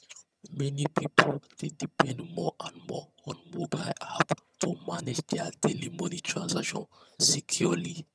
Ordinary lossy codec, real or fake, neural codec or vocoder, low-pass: none; fake; vocoder, 22.05 kHz, 80 mel bands, HiFi-GAN; none